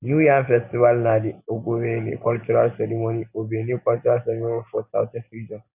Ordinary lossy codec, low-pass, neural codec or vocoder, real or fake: none; 3.6 kHz; none; real